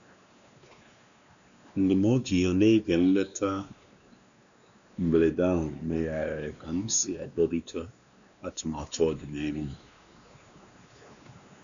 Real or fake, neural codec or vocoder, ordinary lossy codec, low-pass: fake; codec, 16 kHz, 2 kbps, X-Codec, WavLM features, trained on Multilingual LibriSpeech; none; 7.2 kHz